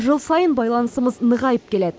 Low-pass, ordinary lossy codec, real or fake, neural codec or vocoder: none; none; real; none